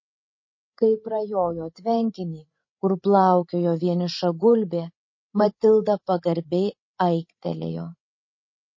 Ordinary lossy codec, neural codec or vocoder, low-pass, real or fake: MP3, 32 kbps; codec, 16 kHz, 16 kbps, FreqCodec, larger model; 7.2 kHz; fake